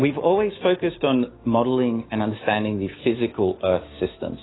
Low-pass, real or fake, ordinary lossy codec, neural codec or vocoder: 7.2 kHz; fake; AAC, 16 kbps; codec, 16 kHz, 6 kbps, DAC